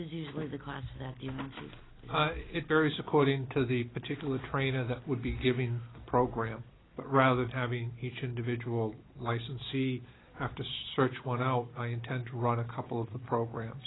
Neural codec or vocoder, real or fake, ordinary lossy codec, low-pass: none; real; AAC, 16 kbps; 7.2 kHz